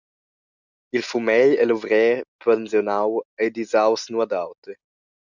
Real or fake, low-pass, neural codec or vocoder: real; 7.2 kHz; none